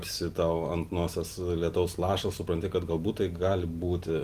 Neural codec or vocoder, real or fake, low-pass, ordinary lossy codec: none; real; 14.4 kHz; Opus, 24 kbps